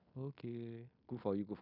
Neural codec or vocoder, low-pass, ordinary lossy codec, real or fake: codec, 16 kHz in and 24 kHz out, 0.9 kbps, LongCat-Audio-Codec, fine tuned four codebook decoder; 5.4 kHz; none; fake